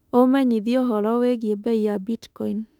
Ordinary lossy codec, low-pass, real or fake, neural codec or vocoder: none; 19.8 kHz; fake; autoencoder, 48 kHz, 32 numbers a frame, DAC-VAE, trained on Japanese speech